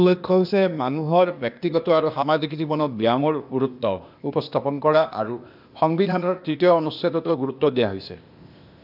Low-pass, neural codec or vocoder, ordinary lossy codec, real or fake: 5.4 kHz; codec, 16 kHz, 0.8 kbps, ZipCodec; AAC, 48 kbps; fake